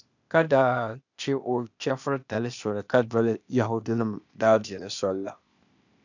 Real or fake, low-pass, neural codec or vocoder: fake; 7.2 kHz; codec, 16 kHz, 0.8 kbps, ZipCodec